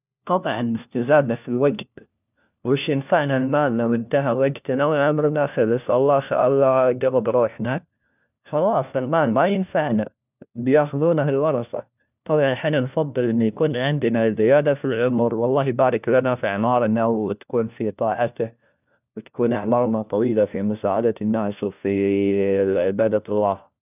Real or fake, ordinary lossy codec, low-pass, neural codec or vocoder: fake; none; 3.6 kHz; codec, 16 kHz, 1 kbps, FunCodec, trained on LibriTTS, 50 frames a second